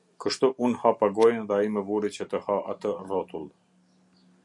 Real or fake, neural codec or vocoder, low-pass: real; none; 10.8 kHz